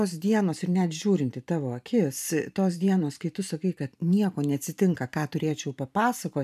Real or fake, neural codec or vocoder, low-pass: fake; vocoder, 44.1 kHz, 128 mel bands every 512 samples, BigVGAN v2; 14.4 kHz